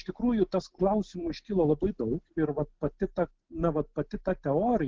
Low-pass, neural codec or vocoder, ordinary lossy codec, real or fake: 7.2 kHz; none; Opus, 16 kbps; real